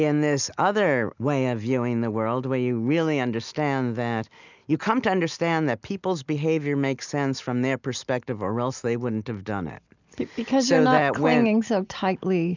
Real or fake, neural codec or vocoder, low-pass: real; none; 7.2 kHz